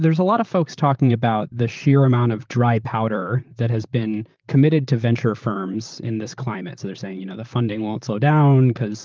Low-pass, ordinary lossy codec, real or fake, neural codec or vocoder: 7.2 kHz; Opus, 24 kbps; fake; vocoder, 44.1 kHz, 128 mel bands, Pupu-Vocoder